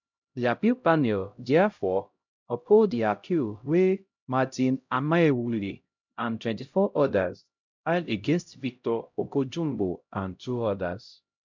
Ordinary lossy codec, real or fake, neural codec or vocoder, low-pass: MP3, 64 kbps; fake; codec, 16 kHz, 0.5 kbps, X-Codec, HuBERT features, trained on LibriSpeech; 7.2 kHz